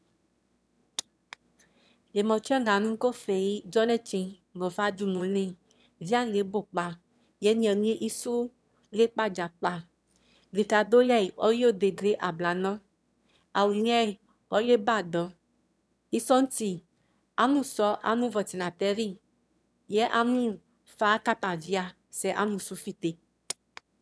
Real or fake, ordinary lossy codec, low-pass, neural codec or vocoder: fake; none; none; autoencoder, 22.05 kHz, a latent of 192 numbers a frame, VITS, trained on one speaker